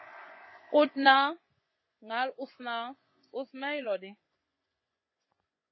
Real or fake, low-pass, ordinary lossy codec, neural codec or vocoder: fake; 7.2 kHz; MP3, 24 kbps; vocoder, 24 kHz, 100 mel bands, Vocos